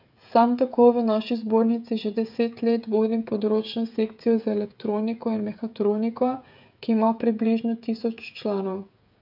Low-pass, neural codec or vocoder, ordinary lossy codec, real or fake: 5.4 kHz; codec, 16 kHz, 16 kbps, FreqCodec, smaller model; none; fake